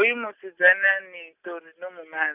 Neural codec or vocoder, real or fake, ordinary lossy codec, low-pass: none; real; none; 3.6 kHz